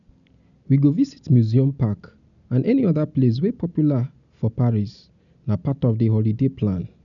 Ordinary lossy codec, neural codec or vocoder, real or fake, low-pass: none; none; real; 7.2 kHz